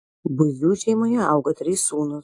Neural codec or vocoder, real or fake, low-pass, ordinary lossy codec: none; real; 10.8 kHz; AAC, 48 kbps